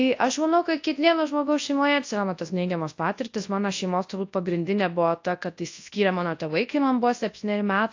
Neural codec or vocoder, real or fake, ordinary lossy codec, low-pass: codec, 24 kHz, 0.9 kbps, WavTokenizer, large speech release; fake; AAC, 48 kbps; 7.2 kHz